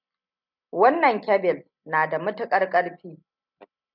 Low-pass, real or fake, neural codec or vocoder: 5.4 kHz; real; none